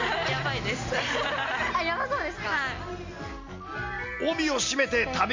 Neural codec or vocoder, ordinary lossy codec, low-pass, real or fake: none; MP3, 48 kbps; 7.2 kHz; real